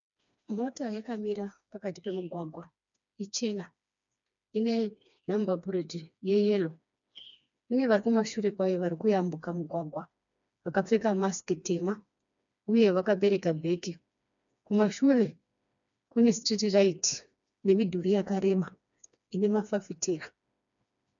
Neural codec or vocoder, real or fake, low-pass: codec, 16 kHz, 2 kbps, FreqCodec, smaller model; fake; 7.2 kHz